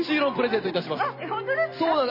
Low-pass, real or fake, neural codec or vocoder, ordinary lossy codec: 5.4 kHz; real; none; MP3, 24 kbps